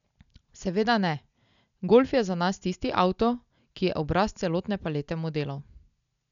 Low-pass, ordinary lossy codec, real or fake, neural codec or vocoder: 7.2 kHz; none; real; none